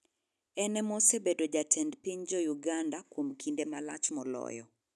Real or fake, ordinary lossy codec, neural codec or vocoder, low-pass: real; none; none; none